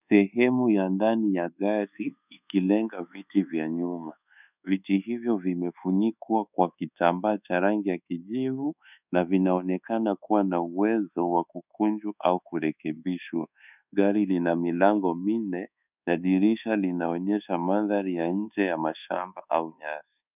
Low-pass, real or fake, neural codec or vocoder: 3.6 kHz; fake; codec, 24 kHz, 1.2 kbps, DualCodec